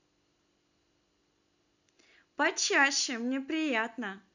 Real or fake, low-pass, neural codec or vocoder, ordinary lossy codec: real; 7.2 kHz; none; none